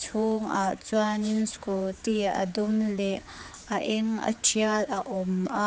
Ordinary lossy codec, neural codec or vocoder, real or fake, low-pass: none; codec, 16 kHz, 4 kbps, X-Codec, HuBERT features, trained on general audio; fake; none